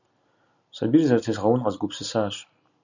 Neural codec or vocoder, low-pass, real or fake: none; 7.2 kHz; real